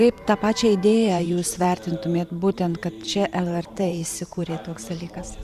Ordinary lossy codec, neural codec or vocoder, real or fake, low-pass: Opus, 64 kbps; vocoder, 44.1 kHz, 128 mel bands every 512 samples, BigVGAN v2; fake; 14.4 kHz